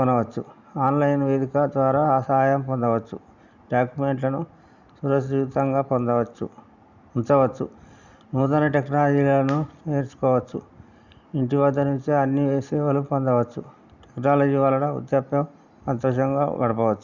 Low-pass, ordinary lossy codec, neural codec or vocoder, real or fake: 7.2 kHz; Opus, 64 kbps; none; real